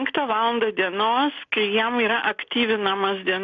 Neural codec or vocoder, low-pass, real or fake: none; 7.2 kHz; real